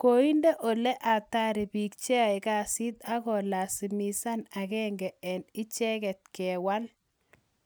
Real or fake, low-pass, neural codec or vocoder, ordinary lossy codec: real; none; none; none